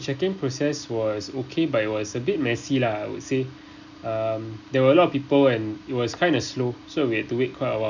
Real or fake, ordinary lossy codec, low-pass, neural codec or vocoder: real; none; 7.2 kHz; none